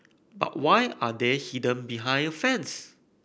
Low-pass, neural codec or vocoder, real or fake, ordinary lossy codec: none; none; real; none